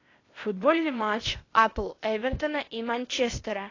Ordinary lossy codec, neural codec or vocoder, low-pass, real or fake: AAC, 32 kbps; codec, 16 kHz, 0.8 kbps, ZipCodec; 7.2 kHz; fake